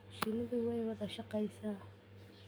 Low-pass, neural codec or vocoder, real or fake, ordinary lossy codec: none; codec, 44.1 kHz, 7.8 kbps, DAC; fake; none